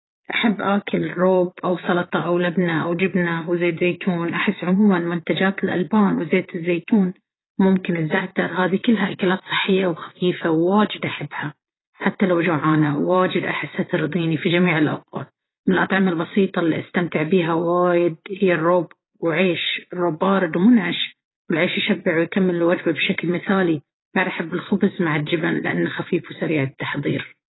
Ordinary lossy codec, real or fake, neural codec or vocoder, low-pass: AAC, 16 kbps; fake; vocoder, 22.05 kHz, 80 mel bands, Vocos; 7.2 kHz